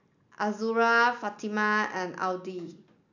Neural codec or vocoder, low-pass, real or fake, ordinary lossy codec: none; 7.2 kHz; real; none